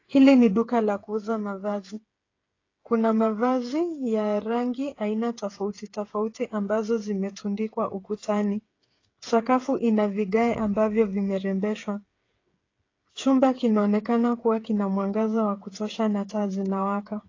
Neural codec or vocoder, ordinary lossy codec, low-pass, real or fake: codec, 16 kHz, 8 kbps, FreqCodec, smaller model; AAC, 32 kbps; 7.2 kHz; fake